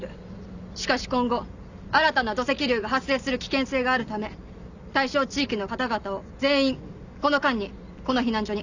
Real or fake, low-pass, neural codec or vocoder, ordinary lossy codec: real; 7.2 kHz; none; none